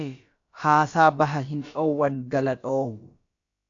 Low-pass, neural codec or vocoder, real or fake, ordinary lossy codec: 7.2 kHz; codec, 16 kHz, about 1 kbps, DyCAST, with the encoder's durations; fake; AAC, 48 kbps